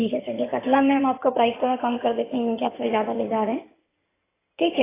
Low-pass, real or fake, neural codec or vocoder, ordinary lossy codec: 3.6 kHz; fake; codec, 16 kHz in and 24 kHz out, 2.2 kbps, FireRedTTS-2 codec; AAC, 16 kbps